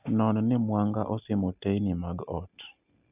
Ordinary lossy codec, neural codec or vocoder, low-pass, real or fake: none; none; 3.6 kHz; real